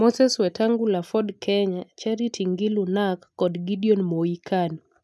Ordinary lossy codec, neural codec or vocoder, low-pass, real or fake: none; none; none; real